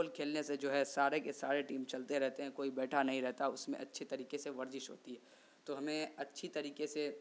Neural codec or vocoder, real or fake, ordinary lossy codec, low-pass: none; real; none; none